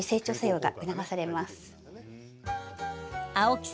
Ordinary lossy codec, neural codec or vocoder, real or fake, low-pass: none; none; real; none